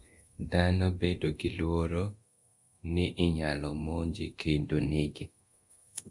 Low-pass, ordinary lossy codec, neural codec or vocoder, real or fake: 10.8 kHz; Opus, 64 kbps; codec, 24 kHz, 0.9 kbps, DualCodec; fake